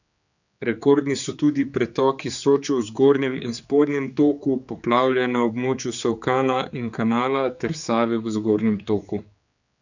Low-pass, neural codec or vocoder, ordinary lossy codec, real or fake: 7.2 kHz; codec, 16 kHz, 4 kbps, X-Codec, HuBERT features, trained on general audio; none; fake